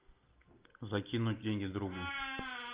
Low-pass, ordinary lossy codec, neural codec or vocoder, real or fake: 3.6 kHz; Opus, 24 kbps; none; real